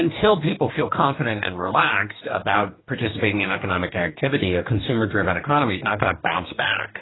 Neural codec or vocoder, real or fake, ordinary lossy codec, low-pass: codec, 44.1 kHz, 2.6 kbps, DAC; fake; AAC, 16 kbps; 7.2 kHz